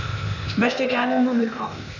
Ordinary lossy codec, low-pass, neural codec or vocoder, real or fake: none; 7.2 kHz; codec, 16 kHz, 0.8 kbps, ZipCodec; fake